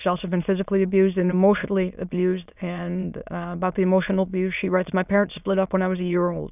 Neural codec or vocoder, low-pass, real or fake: autoencoder, 22.05 kHz, a latent of 192 numbers a frame, VITS, trained on many speakers; 3.6 kHz; fake